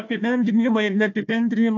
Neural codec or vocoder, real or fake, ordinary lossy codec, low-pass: codec, 16 kHz, 1 kbps, FunCodec, trained on Chinese and English, 50 frames a second; fake; AAC, 48 kbps; 7.2 kHz